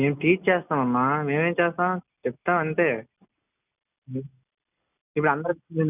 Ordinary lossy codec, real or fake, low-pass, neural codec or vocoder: none; real; 3.6 kHz; none